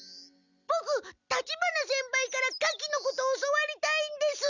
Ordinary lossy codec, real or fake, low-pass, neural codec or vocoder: none; real; 7.2 kHz; none